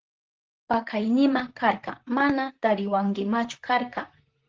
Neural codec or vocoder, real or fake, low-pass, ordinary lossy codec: vocoder, 44.1 kHz, 128 mel bands, Pupu-Vocoder; fake; 7.2 kHz; Opus, 16 kbps